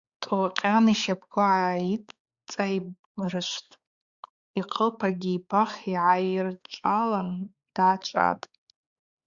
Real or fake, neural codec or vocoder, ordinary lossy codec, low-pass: fake; codec, 16 kHz, 4 kbps, X-Codec, HuBERT features, trained on balanced general audio; Opus, 64 kbps; 7.2 kHz